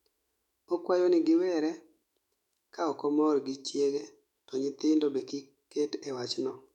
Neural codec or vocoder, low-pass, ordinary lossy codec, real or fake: autoencoder, 48 kHz, 128 numbers a frame, DAC-VAE, trained on Japanese speech; 19.8 kHz; none; fake